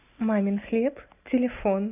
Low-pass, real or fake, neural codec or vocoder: 3.6 kHz; real; none